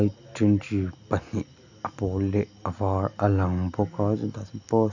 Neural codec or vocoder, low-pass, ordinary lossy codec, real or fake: none; 7.2 kHz; none; real